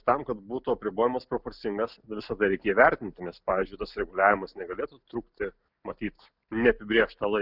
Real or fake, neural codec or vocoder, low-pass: real; none; 5.4 kHz